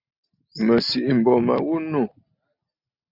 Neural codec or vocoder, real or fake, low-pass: none; real; 5.4 kHz